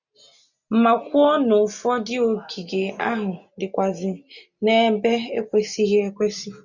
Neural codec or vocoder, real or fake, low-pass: none; real; 7.2 kHz